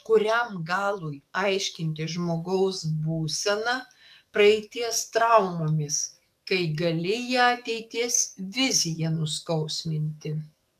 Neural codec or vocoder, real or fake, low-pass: codec, 44.1 kHz, 7.8 kbps, DAC; fake; 14.4 kHz